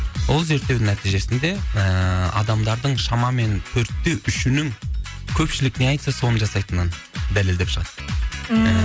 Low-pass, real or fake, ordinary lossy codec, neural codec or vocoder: none; real; none; none